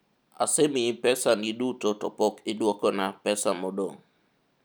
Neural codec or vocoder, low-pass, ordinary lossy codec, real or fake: none; none; none; real